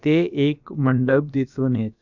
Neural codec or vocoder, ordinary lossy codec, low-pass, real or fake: codec, 16 kHz, about 1 kbps, DyCAST, with the encoder's durations; none; 7.2 kHz; fake